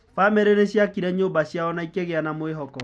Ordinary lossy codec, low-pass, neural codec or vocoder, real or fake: none; none; none; real